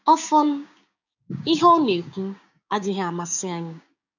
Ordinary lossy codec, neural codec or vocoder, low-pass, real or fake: none; codec, 16 kHz, 6 kbps, DAC; 7.2 kHz; fake